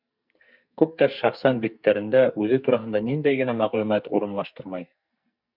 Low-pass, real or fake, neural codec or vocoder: 5.4 kHz; fake; codec, 44.1 kHz, 2.6 kbps, SNAC